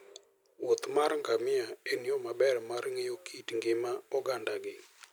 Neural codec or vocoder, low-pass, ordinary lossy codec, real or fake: none; none; none; real